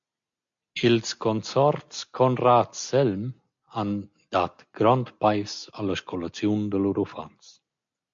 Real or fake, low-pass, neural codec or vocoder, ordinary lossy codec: real; 7.2 kHz; none; MP3, 48 kbps